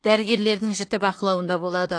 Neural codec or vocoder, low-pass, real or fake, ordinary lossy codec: codec, 24 kHz, 1 kbps, SNAC; 9.9 kHz; fake; AAC, 48 kbps